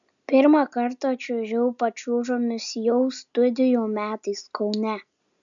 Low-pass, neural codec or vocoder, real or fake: 7.2 kHz; none; real